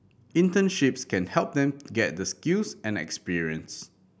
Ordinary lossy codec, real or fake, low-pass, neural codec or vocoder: none; real; none; none